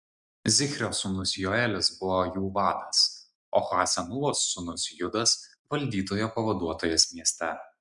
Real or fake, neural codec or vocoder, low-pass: real; none; 10.8 kHz